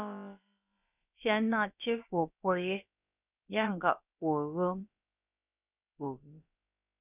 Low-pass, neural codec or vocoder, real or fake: 3.6 kHz; codec, 16 kHz, about 1 kbps, DyCAST, with the encoder's durations; fake